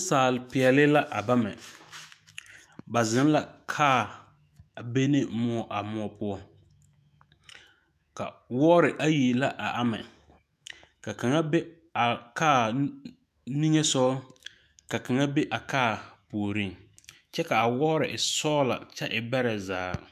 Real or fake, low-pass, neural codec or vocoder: fake; 14.4 kHz; autoencoder, 48 kHz, 128 numbers a frame, DAC-VAE, trained on Japanese speech